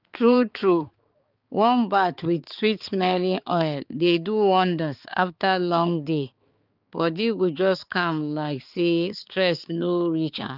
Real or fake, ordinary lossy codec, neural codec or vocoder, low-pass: fake; Opus, 24 kbps; codec, 16 kHz, 4 kbps, X-Codec, HuBERT features, trained on balanced general audio; 5.4 kHz